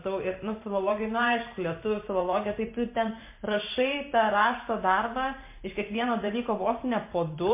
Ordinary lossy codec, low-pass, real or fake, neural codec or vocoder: MP3, 24 kbps; 3.6 kHz; fake; vocoder, 44.1 kHz, 128 mel bands every 512 samples, BigVGAN v2